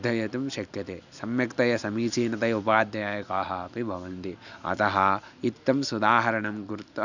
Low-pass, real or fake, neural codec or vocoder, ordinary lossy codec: 7.2 kHz; real; none; none